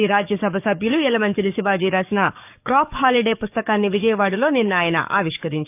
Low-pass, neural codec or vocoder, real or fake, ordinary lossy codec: 3.6 kHz; codec, 44.1 kHz, 7.8 kbps, DAC; fake; none